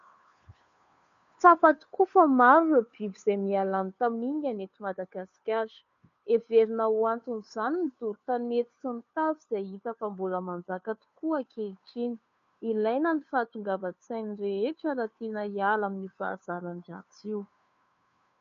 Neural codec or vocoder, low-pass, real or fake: codec, 16 kHz, 2 kbps, FunCodec, trained on Chinese and English, 25 frames a second; 7.2 kHz; fake